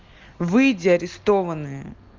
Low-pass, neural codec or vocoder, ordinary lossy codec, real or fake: 7.2 kHz; none; Opus, 32 kbps; real